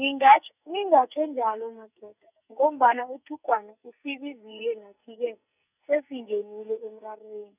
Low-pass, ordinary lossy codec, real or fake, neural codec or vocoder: 3.6 kHz; none; fake; codec, 44.1 kHz, 2.6 kbps, SNAC